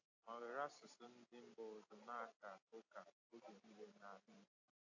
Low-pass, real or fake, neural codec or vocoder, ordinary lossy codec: 7.2 kHz; fake; vocoder, 44.1 kHz, 128 mel bands every 256 samples, BigVGAN v2; AAC, 48 kbps